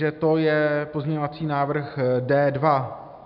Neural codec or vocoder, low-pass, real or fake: none; 5.4 kHz; real